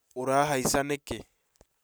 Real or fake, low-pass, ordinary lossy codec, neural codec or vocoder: real; none; none; none